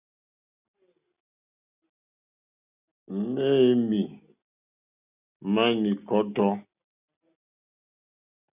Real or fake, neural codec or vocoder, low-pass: real; none; 3.6 kHz